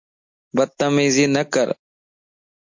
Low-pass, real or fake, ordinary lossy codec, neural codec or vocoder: 7.2 kHz; real; MP3, 48 kbps; none